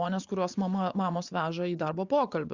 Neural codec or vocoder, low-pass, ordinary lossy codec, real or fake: vocoder, 24 kHz, 100 mel bands, Vocos; 7.2 kHz; Opus, 64 kbps; fake